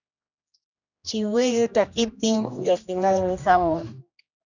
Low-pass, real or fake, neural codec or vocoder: 7.2 kHz; fake; codec, 16 kHz, 1 kbps, X-Codec, HuBERT features, trained on general audio